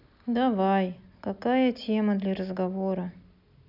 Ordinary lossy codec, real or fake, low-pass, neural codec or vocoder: none; real; 5.4 kHz; none